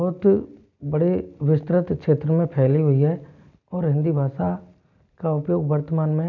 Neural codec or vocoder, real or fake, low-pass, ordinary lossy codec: none; real; 7.2 kHz; none